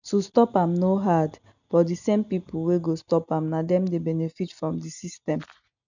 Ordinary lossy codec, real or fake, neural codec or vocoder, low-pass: none; real; none; 7.2 kHz